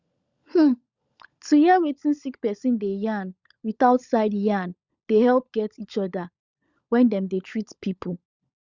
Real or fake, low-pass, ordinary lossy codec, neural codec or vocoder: fake; 7.2 kHz; Opus, 64 kbps; codec, 16 kHz, 16 kbps, FunCodec, trained on LibriTTS, 50 frames a second